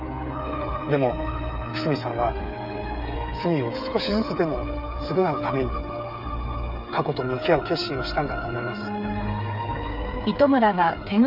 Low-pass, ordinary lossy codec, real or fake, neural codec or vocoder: 5.4 kHz; none; fake; codec, 16 kHz, 8 kbps, FreqCodec, smaller model